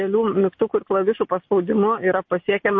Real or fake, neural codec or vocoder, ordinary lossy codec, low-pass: real; none; MP3, 32 kbps; 7.2 kHz